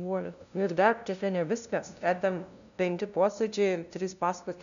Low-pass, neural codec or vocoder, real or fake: 7.2 kHz; codec, 16 kHz, 0.5 kbps, FunCodec, trained on LibriTTS, 25 frames a second; fake